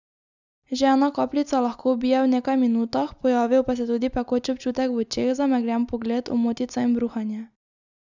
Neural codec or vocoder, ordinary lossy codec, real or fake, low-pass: none; none; real; 7.2 kHz